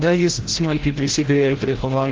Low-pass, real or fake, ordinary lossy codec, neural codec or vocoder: 7.2 kHz; fake; Opus, 16 kbps; codec, 16 kHz, 0.5 kbps, FreqCodec, larger model